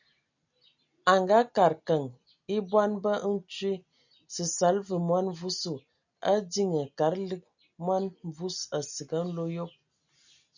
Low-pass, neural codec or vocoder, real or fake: 7.2 kHz; none; real